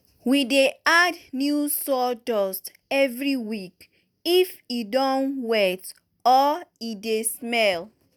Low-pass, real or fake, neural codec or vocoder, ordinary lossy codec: none; real; none; none